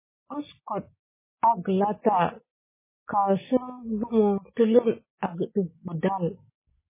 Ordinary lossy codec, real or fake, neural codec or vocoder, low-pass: MP3, 16 kbps; real; none; 3.6 kHz